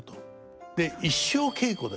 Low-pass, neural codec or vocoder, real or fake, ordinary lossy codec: none; none; real; none